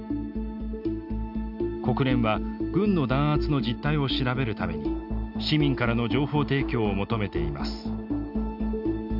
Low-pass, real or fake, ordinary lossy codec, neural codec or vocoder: 5.4 kHz; real; AAC, 48 kbps; none